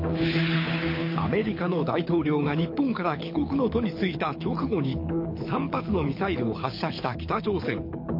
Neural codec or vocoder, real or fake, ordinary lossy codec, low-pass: codec, 24 kHz, 6 kbps, HILCodec; fake; MP3, 32 kbps; 5.4 kHz